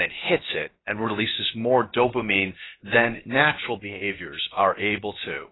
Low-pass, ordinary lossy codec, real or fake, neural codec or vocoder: 7.2 kHz; AAC, 16 kbps; fake; codec, 16 kHz, about 1 kbps, DyCAST, with the encoder's durations